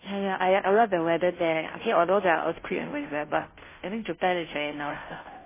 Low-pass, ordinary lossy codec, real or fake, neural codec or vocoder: 3.6 kHz; MP3, 16 kbps; fake; codec, 16 kHz, 0.5 kbps, FunCodec, trained on Chinese and English, 25 frames a second